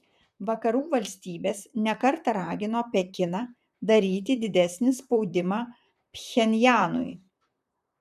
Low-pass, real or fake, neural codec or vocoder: 14.4 kHz; fake; vocoder, 44.1 kHz, 128 mel bands, Pupu-Vocoder